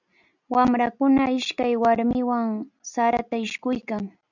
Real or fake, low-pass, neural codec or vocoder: real; 7.2 kHz; none